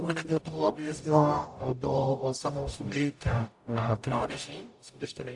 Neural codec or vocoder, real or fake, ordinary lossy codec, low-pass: codec, 44.1 kHz, 0.9 kbps, DAC; fake; AAC, 64 kbps; 10.8 kHz